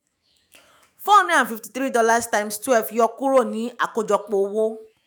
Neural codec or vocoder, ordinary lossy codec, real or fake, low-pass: autoencoder, 48 kHz, 128 numbers a frame, DAC-VAE, trained on Japanese speech; none; fake; none